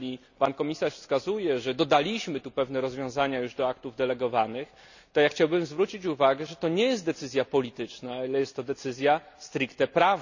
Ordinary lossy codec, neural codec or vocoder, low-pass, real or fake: none; none; 7.2 kHz; real